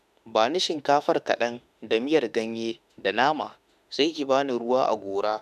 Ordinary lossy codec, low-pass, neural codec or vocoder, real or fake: none; 14.4 kHz; autoencoder, 48 kHz, 32 numbers a frame, DAC-VAE, trained on Japanese speech; fake